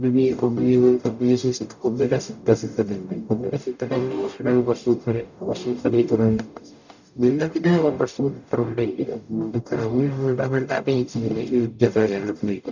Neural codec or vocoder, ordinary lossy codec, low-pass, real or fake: codec, 44.1 kHz, 0.9 kbps, DAC; none; 7.2 kHz; fake